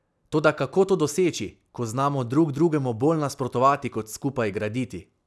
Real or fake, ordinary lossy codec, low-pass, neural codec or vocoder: real; none; none; none